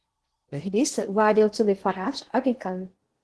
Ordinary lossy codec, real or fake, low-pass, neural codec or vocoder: Opus, 16 kbps; fake; 10.8 kHz; codec, 16 kHz in and 24 kHz out, 0.8 kbps, FocalCodec, streaming, 65536 codes